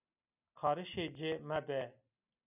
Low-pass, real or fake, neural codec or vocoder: 3.6 kHz; real; none